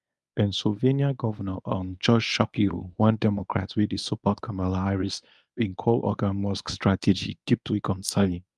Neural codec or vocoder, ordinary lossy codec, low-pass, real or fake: codec, 24 kHz, 0.9 kbps, WavTokenizer, medium speech release version 1; none; none; fake